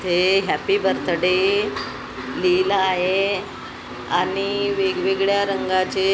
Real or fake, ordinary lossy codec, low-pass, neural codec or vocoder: real; none; none; none